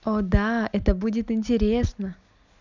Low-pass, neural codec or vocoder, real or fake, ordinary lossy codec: 7.2 kHz; none; real; none